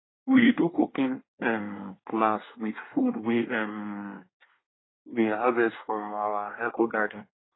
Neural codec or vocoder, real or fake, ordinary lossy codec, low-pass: codec, 24 kHz, 1 kbps, SNAC; fake; AAC, 16 kbps; 7.2 kHz